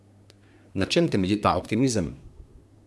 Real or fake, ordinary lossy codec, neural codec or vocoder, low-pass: fake; none; codec, 24 kHz, 1 kbps, SNAC; none